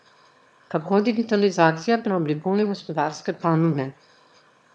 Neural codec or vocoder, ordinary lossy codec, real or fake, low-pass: autoencoder, 22.05 kHz, a latent of 192 numbers a frame, VITS, trained on one speaker; none; fake; none